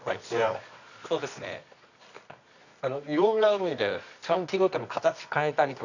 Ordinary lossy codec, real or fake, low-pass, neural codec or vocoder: none; fake; 7.2 kHz; codec, 24 kHz, 0.9 kbps, WavTokenizer, medium music audio release